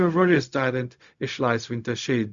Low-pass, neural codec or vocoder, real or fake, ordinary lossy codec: 7.2 kHz; codec, 16 kHz, 0.4 kbps, LongCat-Audio-Codec; fake; Opus, 64 kbps